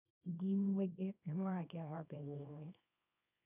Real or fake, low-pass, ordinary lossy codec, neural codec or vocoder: fake; 3.6 kHz; none; codec, 24 kHz, 0.9 kbps, WavTokenizer, small release